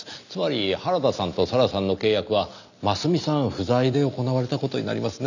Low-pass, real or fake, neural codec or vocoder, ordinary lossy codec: 7.2 kHz; real; none; none